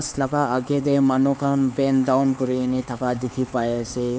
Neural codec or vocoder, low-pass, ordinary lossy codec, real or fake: codec, 16 kHz, 4 kbps, X-Codec, HuBERT features, trained on LibriSpeech; none; none; fake